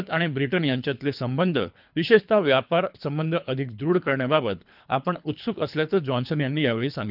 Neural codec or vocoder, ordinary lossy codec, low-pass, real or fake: codec, 24 kHz, 3 kbps, HILCodec; none; 5.4 kHz; fake